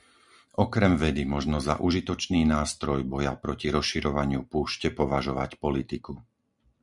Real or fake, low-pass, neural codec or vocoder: real; 10.8 kHz; none